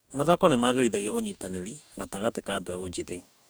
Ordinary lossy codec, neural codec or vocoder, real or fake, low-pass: none; codec, 44.1 kHz, 2.6 kbps, DAC; fake; none